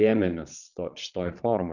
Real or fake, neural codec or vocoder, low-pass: fake; vocoder, 22.05 kHz, 80 mel bands, Vocos; 7.2 kHz